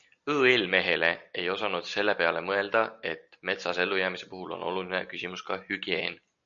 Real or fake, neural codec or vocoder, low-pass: real; none; 7.2 kHz